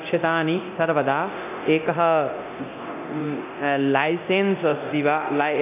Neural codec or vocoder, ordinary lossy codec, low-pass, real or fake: codec, 24 kHz, 0.9 kbps, DualCodec; none; 3.6 kHz; fake